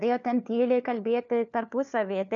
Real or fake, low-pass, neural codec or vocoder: fake; 7.2 kHz; codec, 16 kHz, 4 kbps, FunCodec, trained on LibriTTS, 50 frames a second